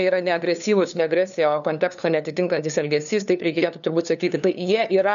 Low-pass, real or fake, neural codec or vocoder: 7.2 kHz; fake; codec, 16 kHz, 2 kbps, FunCodec, trained on LibriTTS, 25 frames a second